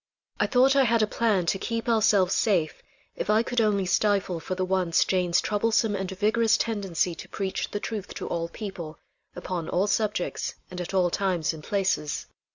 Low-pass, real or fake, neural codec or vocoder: 7.2 kHz; real; none